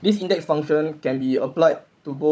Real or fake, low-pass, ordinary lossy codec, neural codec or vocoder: fake; none; none; codec, 16 kHz, 16 kbps, FunCodec, trained on Chinese and English, 50 frames a second